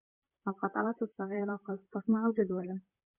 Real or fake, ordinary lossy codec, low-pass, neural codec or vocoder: fake; MP3, 32 kbps; 3.6 kHz; vocoder, 44.1 kHz, 128 mel bands, Pupu-Vocoder